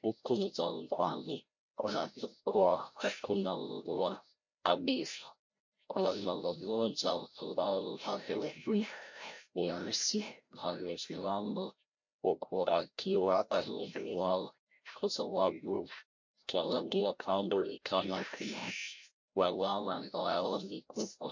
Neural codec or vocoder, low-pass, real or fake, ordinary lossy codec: codec, 16 kHz, 0.5 kbps, FreqCodec, larger model; 7.2 kHz; fake; MP3, 48 kbps